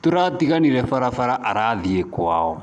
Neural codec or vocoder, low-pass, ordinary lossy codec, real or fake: none; 10.8 kHz; none; real